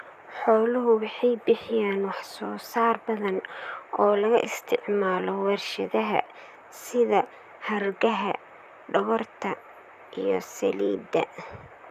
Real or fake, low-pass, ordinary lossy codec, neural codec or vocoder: fake; 14.4 kHz; none; vocoder, 44.1 kHz, 128 mel bands, Pupu-Vocoder